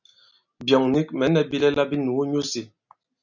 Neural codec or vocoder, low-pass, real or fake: none; 7.2 kHz; real